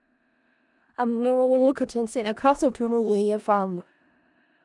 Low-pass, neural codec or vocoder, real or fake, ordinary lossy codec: 10.8 kHz; codec, 16 kHz in and 24 kHz out, 0.4 kbps, LongCat-Audio-Codec, four codebook decoder; fake; none